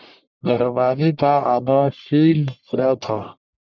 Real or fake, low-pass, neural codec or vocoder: fake; 7.2 kHz; codec, 44.1 kHz, 1.7 kbps, Pupu-Codec